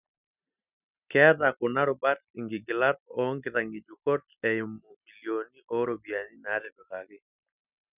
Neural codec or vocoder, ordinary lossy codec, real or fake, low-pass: none; none; real; 3.6 kHz